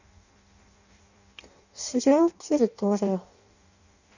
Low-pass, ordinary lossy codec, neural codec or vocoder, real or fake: 7.2 kHz; none; codec, 16 kHz in and 24 kHz out, 0.6 kbps, FireRedTTS-2 codec; fake